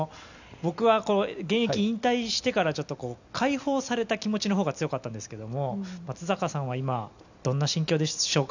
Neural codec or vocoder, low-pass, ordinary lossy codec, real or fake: none; 7.2 kHz; none; real